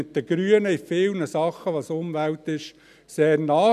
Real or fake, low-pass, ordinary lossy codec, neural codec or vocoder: real; 14.4 kHz; none; none